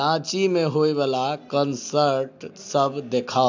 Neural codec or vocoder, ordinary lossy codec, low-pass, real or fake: none; AAC, 48 kbps; 7.2 kHz; real